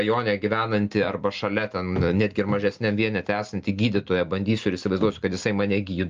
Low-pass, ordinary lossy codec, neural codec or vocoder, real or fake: 7.2 kHz; Opus, 24 kbps; none; real